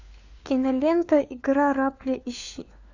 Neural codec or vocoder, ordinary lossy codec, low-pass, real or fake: codec, 16 kHz, 4 kbps, FunCodec, trained on LibriTTS, 50 frames a second; AAC, 48 kbps; 7.2 kHz; fake